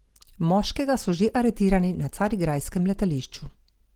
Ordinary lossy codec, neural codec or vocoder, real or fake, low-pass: Opus, 16 kbps; none; real; 19.8 kHz